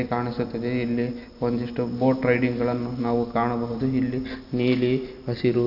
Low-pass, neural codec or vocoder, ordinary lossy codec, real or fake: 5.4 kHz; none; none; real